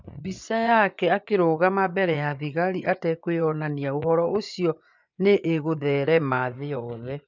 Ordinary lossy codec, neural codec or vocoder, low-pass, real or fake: MP3, 64 kbps; vocoder, 24 kHz, 100 mel bands, Vocos; 7.2 kHz; fake